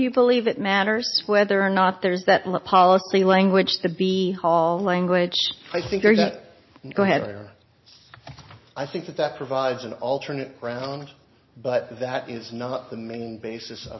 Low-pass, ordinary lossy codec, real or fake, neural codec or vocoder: 7.2 kHz; MP3, 24 kbps; real; none